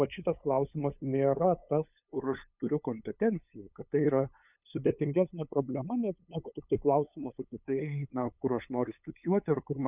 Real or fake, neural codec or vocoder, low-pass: fake; codec, 16 kHz, 8 kbps, FunCodec, trained on LibriTTS, 25 frames a second; 3.6 kHz